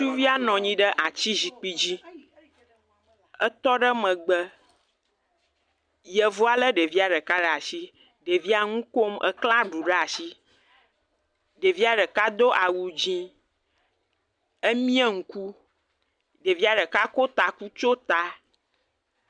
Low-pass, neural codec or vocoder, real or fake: 9.9 kHz; none; real